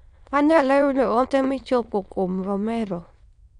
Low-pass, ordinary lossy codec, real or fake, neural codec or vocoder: 9.9 kHz; none; fake; autoencoder, 22.05 kHz, a latent of 192 numbers a frame, VITS, trained on many speakers